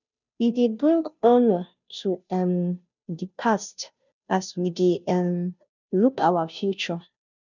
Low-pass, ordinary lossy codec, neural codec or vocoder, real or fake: 7.2 kHz; none; codec, 16 kHz, 0.5 kbps, FunCodec, trained on Chinese and English, 25 frames a second; fake